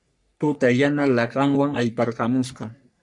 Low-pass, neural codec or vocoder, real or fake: 10.8 kHz; codec, 44.1 kHz, 3.4 kbps, Pupu-Codec; fake